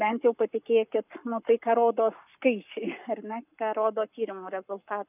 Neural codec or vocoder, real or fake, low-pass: codec, 44.1 kHz, 7.8 kbps, Pupu-Codec; fake; 3.6 kHz